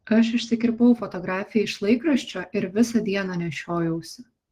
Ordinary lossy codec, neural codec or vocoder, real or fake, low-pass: Opus, 16 kbps; none; real; 14.4 kHz